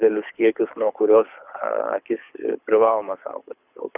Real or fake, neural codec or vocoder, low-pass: fake; codec, 24 kHz, 6 kbps, HILCodec; 3.6 kHz